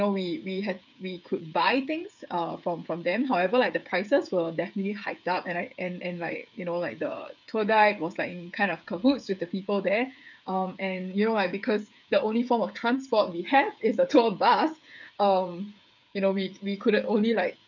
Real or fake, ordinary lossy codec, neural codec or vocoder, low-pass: fake; none; codec, 16 kHz, 8 kbps, FreqCodec, smaller model; 7.2 kHz